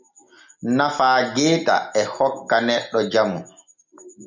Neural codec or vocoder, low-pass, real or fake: none; 7.2 kHz; real